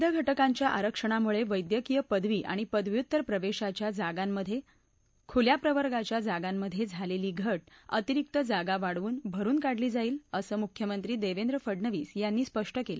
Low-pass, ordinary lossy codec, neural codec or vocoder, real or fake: none; none; none; real